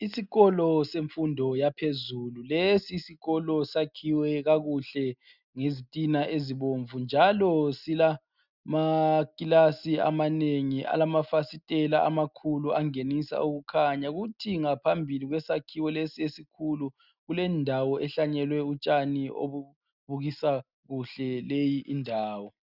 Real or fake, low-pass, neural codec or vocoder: real; 5.4 kHz; none